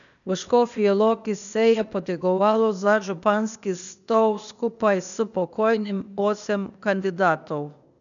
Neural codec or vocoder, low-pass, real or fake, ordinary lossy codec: codec, 16 kHz, 0.8 kbps, ZipCodec; 7.2 kHz; fake; MP3, 96 kbps